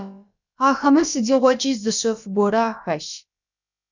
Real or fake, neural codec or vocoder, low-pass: fake; codec, 16 kHz, about 1 kbps, DyCAST, with the encoder's durations; 7.2 kHz